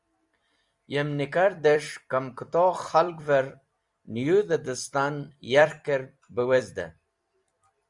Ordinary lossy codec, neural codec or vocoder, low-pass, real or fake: Opus, 64 kbps; vocoder, 44.1 kHz, 128 mel bands every 256 samples, BigVGAN v2; 10.8 kHz; fake